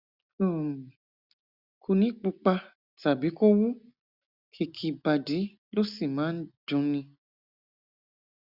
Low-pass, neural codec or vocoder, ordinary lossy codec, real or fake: 5.4 kHz; none; Opus, 64 kbps; real